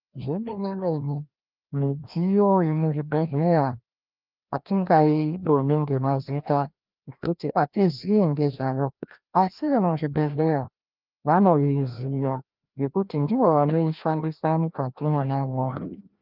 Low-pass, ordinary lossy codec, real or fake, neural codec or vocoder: 5.4 kHz; Opus, 24 kbps; fake; codec, 16 kHz, 1 kbps, FreqCodec, larger model